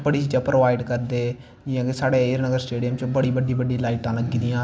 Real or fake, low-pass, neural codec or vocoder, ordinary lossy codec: real; none; none; none